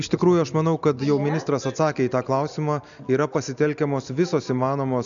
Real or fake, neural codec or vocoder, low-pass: real; none; 7.2 kHz